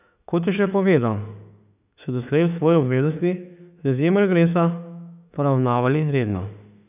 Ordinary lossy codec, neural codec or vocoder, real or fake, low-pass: none; autoencoder, 48 kHz, 32 numbers a frame, DAC-VAE, trained on Japanese speech; fake; 3.6 kHz